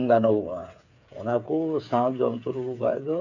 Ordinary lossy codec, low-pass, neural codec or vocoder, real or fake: none; 7.2 kHz; codec, 16 kHz, 8 kbps, FreqCodec, smaller model; fake